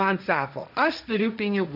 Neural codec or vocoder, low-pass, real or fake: codec, 16 kHz, 1.1 kbps, Voila-Tokenizer; 5.4 kHz; fake